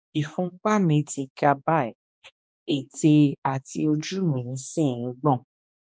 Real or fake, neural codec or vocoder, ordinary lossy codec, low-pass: fake; codec, 16 kHz, 2 kbps, X-Codec, HuBERT features, trained on balanced general audio; none; none